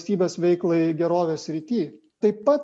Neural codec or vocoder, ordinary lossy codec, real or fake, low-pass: none; MP3, 48 kbps; real; 10.8 kHz